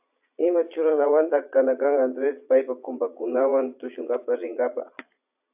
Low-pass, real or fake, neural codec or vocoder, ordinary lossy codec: 3.6 kHz; fake; vocoder, 22.05 kHz, 80 mel bands, Vocos; AAC, 32 kbps